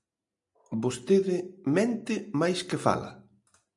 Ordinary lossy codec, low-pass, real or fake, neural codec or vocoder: MP3, 96 kbps; 10.8 kHz; real; none